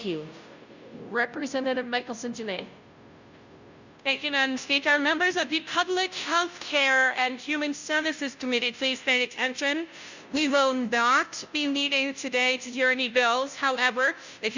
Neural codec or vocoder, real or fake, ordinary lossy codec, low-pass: codec, 16 kHz, 0.5 kbps, FunCodec, trained on Chinese and English, 25 frames a second; fake; Opus, 64 kbps; 7.2 kHz